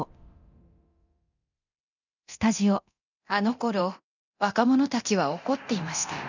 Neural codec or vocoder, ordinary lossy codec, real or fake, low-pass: codec, 24 kHz, 0.9 kbps, DualCodec; none; fake; 7.2 kHz